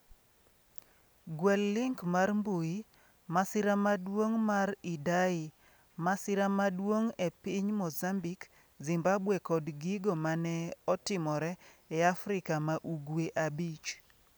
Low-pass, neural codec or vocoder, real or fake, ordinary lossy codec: none; none; real; none